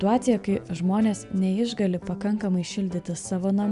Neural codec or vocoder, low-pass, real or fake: none; 10.8 kHz; real